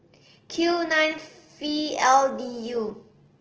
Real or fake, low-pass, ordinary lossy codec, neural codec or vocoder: real; 7.2 kHz; Opus, 16 kbps; none